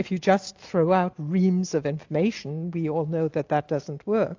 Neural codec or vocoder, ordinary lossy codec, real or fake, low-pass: none; AAC, 48 kbps; real; 7.2 kHz